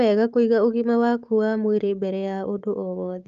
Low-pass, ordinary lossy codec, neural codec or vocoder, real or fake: 7.2 kHz; Opus, 24 kbps; codec, 16 kHz, 6 kbps, DAC; fake